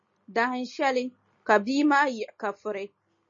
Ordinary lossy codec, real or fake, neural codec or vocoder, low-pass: MP3, 32 kbps; real; none; 7.2 kHz